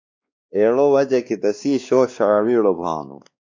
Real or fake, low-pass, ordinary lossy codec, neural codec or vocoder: fake; 7.2 kHz; MP3, 64 kbps; codec, 16 kHz, 2 kbps, X-Codec, WavLM features, trained on Multilingual LibriSpeech